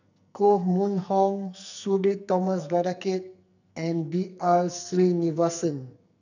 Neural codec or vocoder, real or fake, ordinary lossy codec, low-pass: codec, 44.1 kHz, 2.6 kbps, SNAC; fake; none; 7.2 kHz